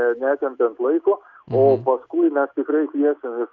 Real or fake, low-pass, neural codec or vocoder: real; 7.2 kHz; none